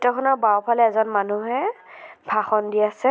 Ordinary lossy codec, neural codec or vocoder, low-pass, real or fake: none; none; none; real